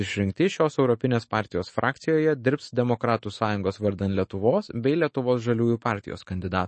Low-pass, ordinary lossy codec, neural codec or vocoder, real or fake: 10.8 kHz; MP3, 32 kbps; autoencoder, 48 kHz, 128 numbers a frame, DAC-VAE, trained on Japanese speech; fake